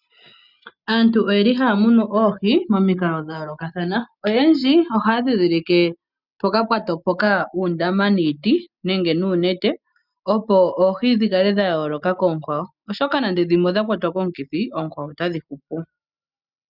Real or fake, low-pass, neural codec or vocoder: real; 5.4 kHz; none